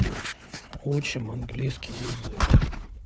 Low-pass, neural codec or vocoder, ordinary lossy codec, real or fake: none; codec, 16 kHz, 4 kbps, FunCodec, trained on Chinese and English, 50 frames a second; none; fake